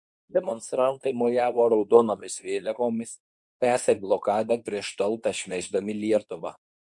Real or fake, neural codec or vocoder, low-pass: fake; codec, 24 kHz, 0.9 kbps, WavTokenizer, medium speech release version 2; 10.8 kHz